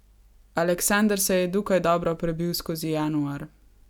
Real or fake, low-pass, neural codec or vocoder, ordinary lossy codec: real; 19.8 kHz; none; none